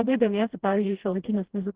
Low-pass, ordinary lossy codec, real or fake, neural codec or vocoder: 3.6 kHz; Opus, 16 kbps; fake; codec, 16 kHz, 1 kbps, FreqCodec, smaller model